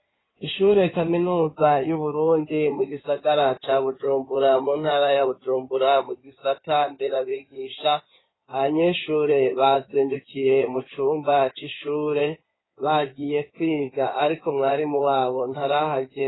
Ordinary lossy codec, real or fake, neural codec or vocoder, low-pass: AAC, 16 kbps; fake; codec, 16 kHz in and 24 kHz out, 2.2 kbps, FireRedTTS-2 codec; 7.2 kHz